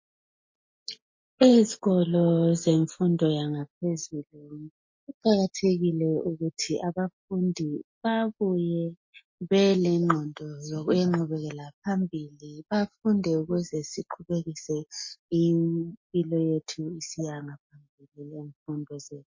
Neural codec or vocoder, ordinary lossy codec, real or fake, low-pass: none; MP3, 32 kbps; real; 7.2 kHz